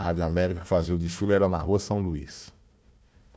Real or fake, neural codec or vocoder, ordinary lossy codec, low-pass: fake; codec, 16 kHz, 1 kbps, FunCodec, trained on Chinese and English, 50 frames a second; none; none